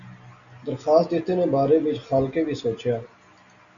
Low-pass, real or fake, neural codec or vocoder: 7.2 kHz; real; none